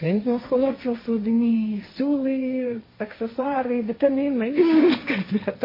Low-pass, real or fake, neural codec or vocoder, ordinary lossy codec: 5.4 kHz; fake; codec, 16 kHz, 1.1 kbps, Voila-Tokenizer; MP3, 24 kbps